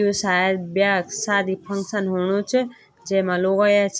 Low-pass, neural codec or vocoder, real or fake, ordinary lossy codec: none; none; real; none